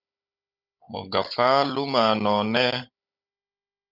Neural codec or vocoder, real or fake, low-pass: codec, 16 kHz, 16 kbps, FunCodec, trained on Chinese and English, 50 frames a second; fake; 5.4 kHz